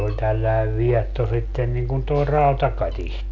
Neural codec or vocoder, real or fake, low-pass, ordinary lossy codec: none; real; 7.2 kHz; none